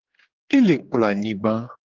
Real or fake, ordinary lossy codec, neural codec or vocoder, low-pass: fake; Opus, 16 kbps; codec, 16 kHz, 4 kbps, X-Codec, HuBERT features, trained on general audio; 7.2 kHz